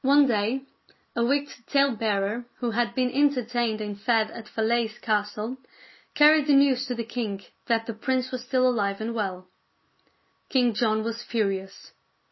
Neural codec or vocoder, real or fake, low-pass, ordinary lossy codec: none; real; 7.2 kHz; MP3, 24 kbps